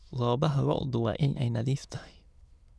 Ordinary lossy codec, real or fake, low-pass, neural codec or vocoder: none; fake; none; autoencoder, 22.05 kHz, a latent of 192 numbers a frame, VITS, trained on many speakers